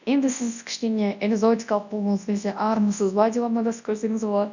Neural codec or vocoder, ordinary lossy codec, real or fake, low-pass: codec, 24 kHz, 0.9 kbps, WavTokenizer, large speech release; none; fake; 7.2 kHz